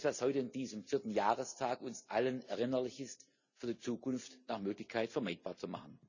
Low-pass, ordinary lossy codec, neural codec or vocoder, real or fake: 7.2 kHz; none; none; real